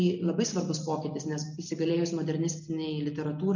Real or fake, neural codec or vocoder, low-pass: real; none; 7.2 kHz